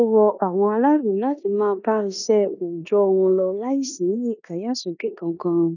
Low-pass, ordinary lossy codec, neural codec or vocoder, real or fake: 7.2 kHz; none; codec, 16 kHz in and 24 kHz out, 0.9 kbps, LongCat-Audio-Codec, four codebook decoder; fake